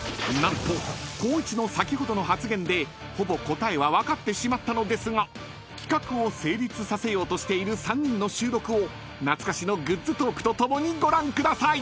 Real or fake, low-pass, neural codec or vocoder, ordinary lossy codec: real; none; none; none